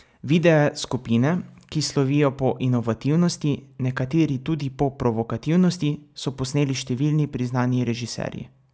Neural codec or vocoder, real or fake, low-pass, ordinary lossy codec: none; real; none; none